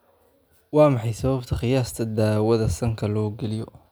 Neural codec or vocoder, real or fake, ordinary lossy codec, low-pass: none; real; none; none